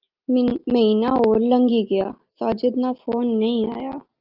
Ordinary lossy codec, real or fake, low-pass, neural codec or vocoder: Opus, 24 kbps; real; 5.4 kHz; none